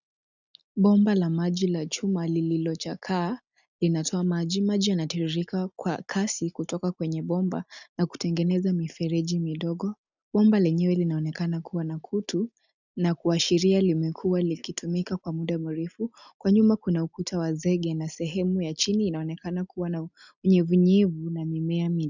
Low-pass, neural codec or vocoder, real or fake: 7.2 kHz; none; real